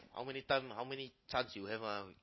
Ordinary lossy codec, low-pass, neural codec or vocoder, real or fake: MP3, 24 kbps; 7.2 kHz; none; real